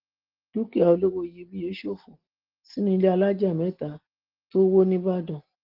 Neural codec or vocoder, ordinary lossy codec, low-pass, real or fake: none; Opus, 16 kbps; 5.4 kHz; real